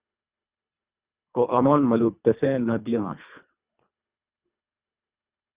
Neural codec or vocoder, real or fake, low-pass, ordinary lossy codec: codec, 24 kHz, 1.5 kbps, HILCodec; fake; 3.6 kHz; Opus, 64 kbps